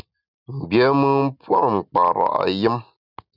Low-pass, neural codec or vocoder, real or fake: 5.4 kHz; none; real